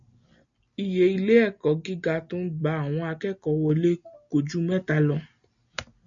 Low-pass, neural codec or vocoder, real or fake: 7.2 kHz; none; real